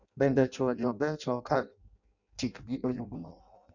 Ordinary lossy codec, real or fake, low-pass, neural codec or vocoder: none; fake; 7.2 kHz; codec, 16 kHz in and 24 kHz out, 0.6 kbps, FireRedTTS-2 codec